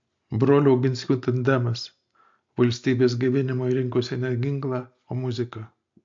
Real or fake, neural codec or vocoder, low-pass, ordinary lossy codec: real; none; 7.2 kHz; MP3, 48 kbps